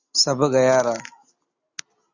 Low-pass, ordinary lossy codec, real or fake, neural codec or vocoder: 7.2 kHz; Opus, 64 kbps; real; none